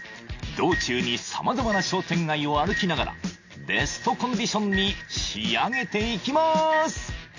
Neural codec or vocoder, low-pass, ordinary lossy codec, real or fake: none; 7.2 kHz; AAC, 48 kbps; real